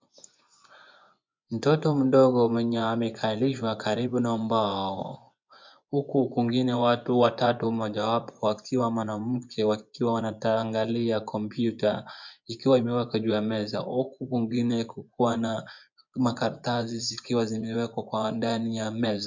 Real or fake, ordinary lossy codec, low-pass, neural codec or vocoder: fake; MP3, 64 kbps; 7.2 kHz; vocoder, 24 kHz, 100 mel bands, Vocos